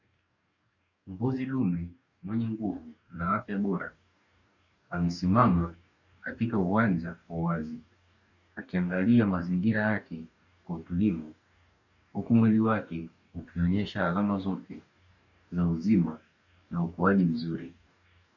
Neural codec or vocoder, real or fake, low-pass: codec, 44.1 kHz, 2.6 kbps, DAC; fake; 7.2 kHz